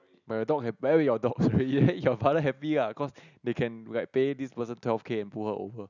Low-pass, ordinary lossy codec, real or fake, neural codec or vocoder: 7.2 kHz; none; real; none